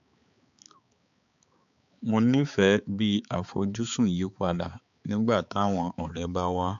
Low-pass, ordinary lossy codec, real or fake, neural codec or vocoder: 7.2 kHz; none; fake; codec, 16 kHz, 4 kbps, X-Codec, HuBERT features, trained on balanced general audio